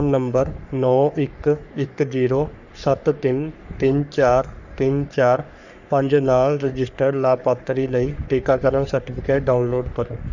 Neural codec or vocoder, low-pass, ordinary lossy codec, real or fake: codec, 44.1 kHz, 3.4 kbps, Pupu-Codec; 7.2 kHz; none; fake